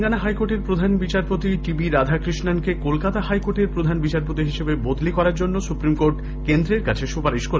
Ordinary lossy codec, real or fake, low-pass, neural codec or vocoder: none; real; none; none